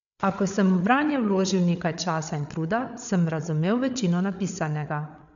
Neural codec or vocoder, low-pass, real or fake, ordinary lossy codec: codec, 16 kHz, 8 kbps, FreqCodec, larger model; 7.2 kHz; fake; none